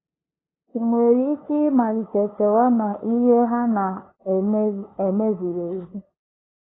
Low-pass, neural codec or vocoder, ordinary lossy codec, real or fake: 7.2 kHz; codec, 16 kHz, 2 kbps, FunCodec, trained on LibriTTS, 25 frames a second; AAC, 16 kbps; fake